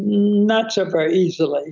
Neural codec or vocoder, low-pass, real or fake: none; 7.2 kHz; real